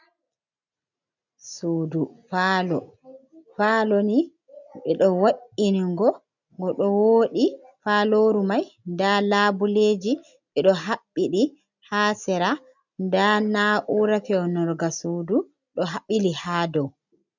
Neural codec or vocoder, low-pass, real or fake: none; 7.2 kHz; real